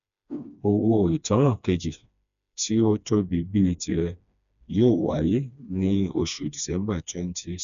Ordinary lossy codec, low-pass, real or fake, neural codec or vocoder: none; 7.2 kHz; fake; codec, 16 kHz, 2 kbps, FreqCodec, smaller model